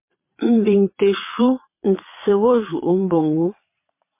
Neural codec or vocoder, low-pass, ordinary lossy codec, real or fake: vocoder, 22.05 kHz, 80 mel bands, Vocos; 3.6 kHz; MP3, 24 kbps; fake